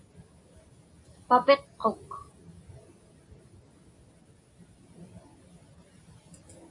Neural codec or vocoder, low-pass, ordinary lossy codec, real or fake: none; 10.8 kHz; AAC, 64 kbps; real